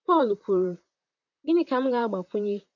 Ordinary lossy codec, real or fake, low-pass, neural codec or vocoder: none; fake; 7.2 kHz; vocoder, 44.1 kHz, 128 mel bands, Pupu-Vocoder